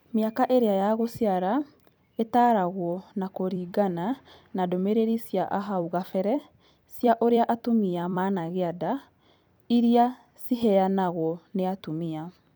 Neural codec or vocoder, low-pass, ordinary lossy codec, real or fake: vocoder, 44.1 kHz, 128 mel bands every 256 samples, BigVGAN v2; none; none; fake